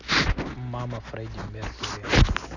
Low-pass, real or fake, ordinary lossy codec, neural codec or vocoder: 7.2 kHz; real; none; none